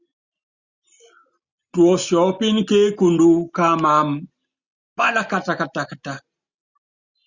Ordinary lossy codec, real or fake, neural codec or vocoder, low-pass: Opus, 64 kbps; real; none; 7.2 kHz